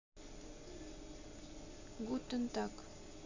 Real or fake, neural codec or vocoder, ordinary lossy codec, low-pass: real; none; AAC, 48 kbps; 7.2 kHz